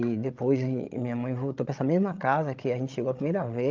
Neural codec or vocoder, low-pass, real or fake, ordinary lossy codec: codec, 16 kHz, 16 kbps, FreqCodec, larger model; 7.2 kHz; fake; Opus, 24 kbps